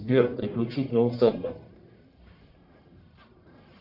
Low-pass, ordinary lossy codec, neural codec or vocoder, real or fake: 5.4 kHz; AAC, 48 kbps; codec, 44.1 kHz, 1.7 kbps, Pupu-Codec; fake